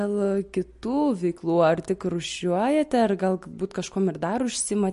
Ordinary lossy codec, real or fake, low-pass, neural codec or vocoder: MP3, 48 kbps; real; 14.4 kHz; none